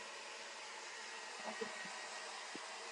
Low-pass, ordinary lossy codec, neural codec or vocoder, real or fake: 10.8 kHz; AAC, 64 kbps; none; real